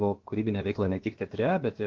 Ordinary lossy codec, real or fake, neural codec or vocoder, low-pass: Opus, 16 kbps; fake; codec, 16 kHz, about 1 kbps, DyCAST, with the encoder's durations; 7.2 kHz